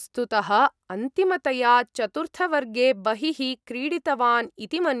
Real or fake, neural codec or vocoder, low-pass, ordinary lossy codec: real; none; none; none